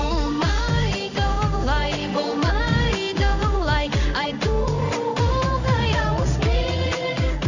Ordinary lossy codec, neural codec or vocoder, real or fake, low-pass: none; codec, 16 kHz in and 24 kHz out, 1 kbps, XY-Tokenizer; fake; 7.2 kHz